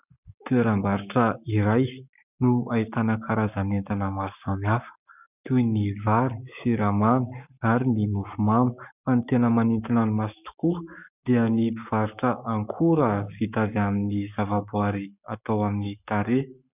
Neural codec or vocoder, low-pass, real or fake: codec, 44.1 kHz, 7.8 kbps, Pupu-Codec; 3.6 kHz; fake